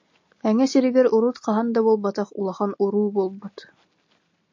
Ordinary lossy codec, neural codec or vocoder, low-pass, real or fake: MP3, 48 kbps; none; 7.2 kHz; real